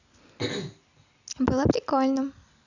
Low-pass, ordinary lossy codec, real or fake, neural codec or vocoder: 7.2 kHz; none; real; none